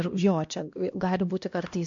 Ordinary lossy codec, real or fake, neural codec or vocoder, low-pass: MP3, 48 kbps; fake; codec, 16 kHz, 1 kbps, X-Codec, HuBERT features, trained on LibriSpeech; 7.2 kHz